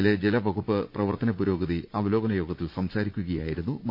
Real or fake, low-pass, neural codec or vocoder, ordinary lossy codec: fake; 5.4 kHz; vocoder, 44.1 kHz, 80 mel bands, Vocos; MP3, 48 kbps